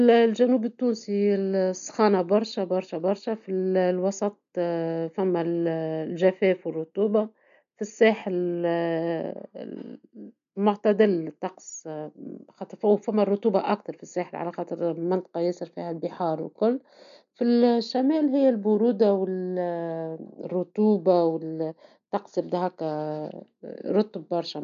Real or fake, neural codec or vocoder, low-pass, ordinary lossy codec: real; none; 7.2 kHz; none